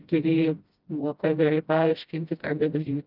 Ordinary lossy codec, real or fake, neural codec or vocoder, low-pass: Opus, 24 kbps; fake; codec, 16 kHz, 0.5 kbps, FreqCodec, smaller model; 5.4 kHz